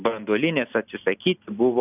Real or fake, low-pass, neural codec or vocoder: real; 3.6 kHz; none